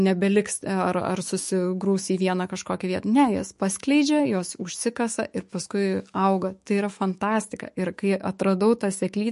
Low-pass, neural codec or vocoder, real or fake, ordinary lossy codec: 14.4 kHz; autoencoder, 48 kHz, 128 numbers a frame, DAC-VAE, trained on Japanese speech; fake; MP3, 48 kbps